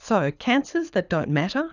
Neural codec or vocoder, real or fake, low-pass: codec, 24 kHz, 6 kbps, HILCodec; fake; 7.2 kHz